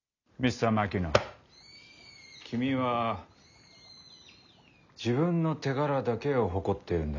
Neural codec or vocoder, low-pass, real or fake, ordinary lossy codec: none; 7.2 kHz; real; none